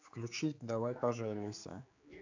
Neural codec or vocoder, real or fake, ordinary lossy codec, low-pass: codec, 16 kHz, 4 kbps, X-Codec, HuBERT features, trained on general audio; fake; AAC, 48 kbps; 7.2 kHz